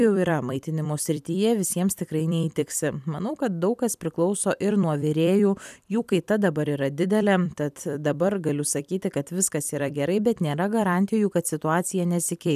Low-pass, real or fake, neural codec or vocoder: 14.4 kHz; fake; vocoder, 44.1 kHz, 128 mel bands every 256 samples, BigVGAN v2